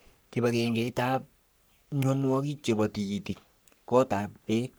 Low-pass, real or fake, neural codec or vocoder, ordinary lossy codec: none; fake; codec, 44.1 kHz, 3.4 kbps, Pupu-Codec; none